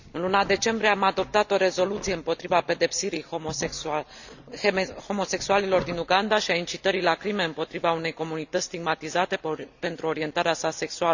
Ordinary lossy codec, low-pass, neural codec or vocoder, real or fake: none; 7.2 kHz; none; real